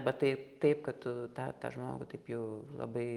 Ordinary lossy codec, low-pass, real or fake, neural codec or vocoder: Opus, 32 kbps; 19.8 kHz; real; none